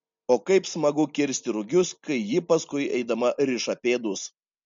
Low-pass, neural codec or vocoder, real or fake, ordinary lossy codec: 7.2 kHz; none; real; MP3, 48 kbps